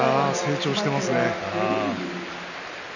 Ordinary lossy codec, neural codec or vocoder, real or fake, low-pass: none; none; real; 7.2 kHz